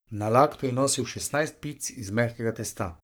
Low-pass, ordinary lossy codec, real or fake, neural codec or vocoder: none; none; fake; codec, 44.1 kHz, 3.4 kbps, Pupu-Codec